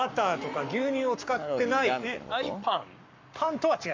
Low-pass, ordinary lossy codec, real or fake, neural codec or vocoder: 7.2 kHz; MP3, 64 kbps; fake; codec, 44.1 kHz, 7.8 kbps, Pupu-Codec